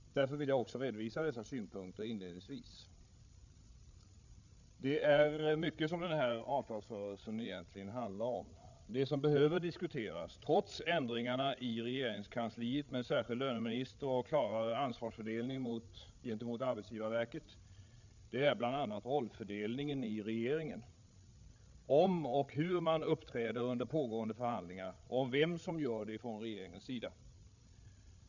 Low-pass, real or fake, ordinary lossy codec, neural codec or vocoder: 7.2 kHz; fake; none; codec, 16 kHz, 8 kbps, FreqCodec, larger model